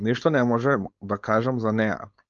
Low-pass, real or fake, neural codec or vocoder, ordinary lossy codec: 7.2 kHz; fake; codec, 16 kHz, 4.8 kbps, FACodec; Opus, 24 kbps